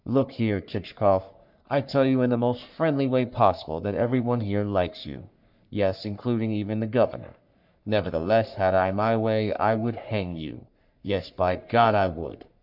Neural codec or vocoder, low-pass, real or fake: codec, 44.1 kHz, 3.4 kbps, Pupu-Codec; 5.4 kHz; fake